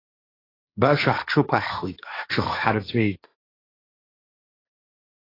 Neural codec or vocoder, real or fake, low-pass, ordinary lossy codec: codec, 16 kHz, 1.1 kbps, Voila-Tokenizer; fake; 5.4 kHz; AAC, 24 kbps